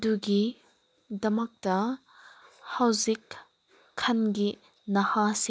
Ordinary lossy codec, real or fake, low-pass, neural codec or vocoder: none; real; none; none